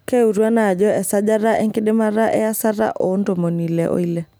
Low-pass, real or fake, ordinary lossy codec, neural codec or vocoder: none; real; none; none